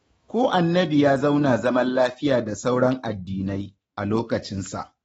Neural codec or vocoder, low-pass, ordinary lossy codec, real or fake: autoencoder, 48 kHz, 128 numbers a frame, DAC-VAE, trained on Japanese speech; 19.8 kHz; AAC, 24 kbps; fake